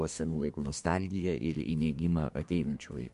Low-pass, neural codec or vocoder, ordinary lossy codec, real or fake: 10.8 kHz; codec, 24 kHz, 1 kbps, SNAC; MP3, 64 kbps; fake